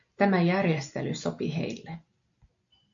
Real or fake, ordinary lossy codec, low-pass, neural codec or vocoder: real; MP3, 48 kbps; 7.2 kHz; none